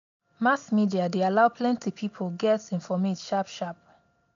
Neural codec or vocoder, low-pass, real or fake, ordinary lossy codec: none; 7.2 kHz; real; MP3, 64 kbps